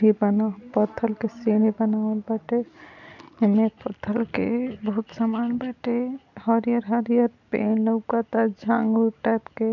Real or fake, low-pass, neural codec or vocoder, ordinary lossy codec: real; 7.2 kHz; none; none